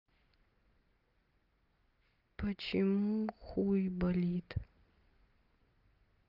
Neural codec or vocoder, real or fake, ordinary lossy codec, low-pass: none; real; Opus, 32 kbps; 5.4 kHz